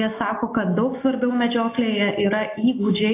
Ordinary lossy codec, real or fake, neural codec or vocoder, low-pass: AAC, 24 kbps; real; none; 3.6 kHz